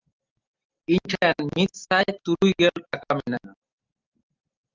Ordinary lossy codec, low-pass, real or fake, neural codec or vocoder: Opus, 32 kbps; 7.2 kHz; real; none